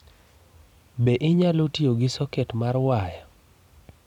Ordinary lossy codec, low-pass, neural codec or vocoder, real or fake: none; 19.8 kHz; vocoder, 44.1 kHz, 128 mel bands every 256 samples, BigVGAN v2; fake